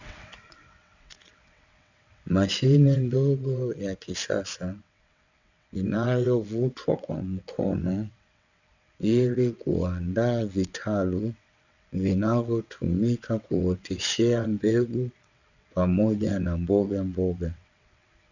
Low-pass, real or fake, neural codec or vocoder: 7.2 kHz; fake; vocoder, 22.05 kHz, 80 mel bands, WaveNeXt